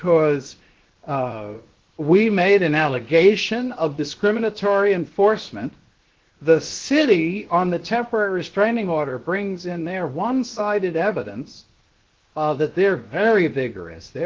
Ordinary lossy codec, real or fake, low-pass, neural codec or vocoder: Opus, 16 kbps; fake; 7.2 kHz; codec, 16 kHz, 0.7 kbps, FocalCodec